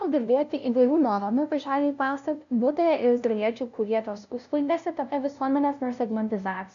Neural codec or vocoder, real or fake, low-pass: codec, 16 kHz, 0.5 kbps, FunCodec, trained on LibriTTS, 25 frames a second; fake; 7.2 kHz